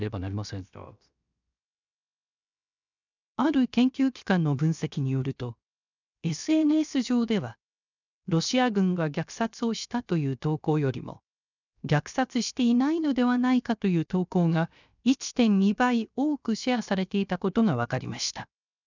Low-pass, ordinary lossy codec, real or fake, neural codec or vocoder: 7.2 kHz; none; fake; codec, 16 kHz, 0.7 kbps, FocalCodec